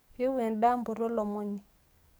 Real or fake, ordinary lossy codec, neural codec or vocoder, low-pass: fake; none; codec, 44.1 kHz, 7.8 kbps, DAC; none